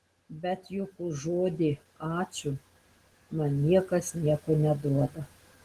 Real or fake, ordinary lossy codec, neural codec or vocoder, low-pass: real; Opus, 16 kbps; none; 14.4 kHz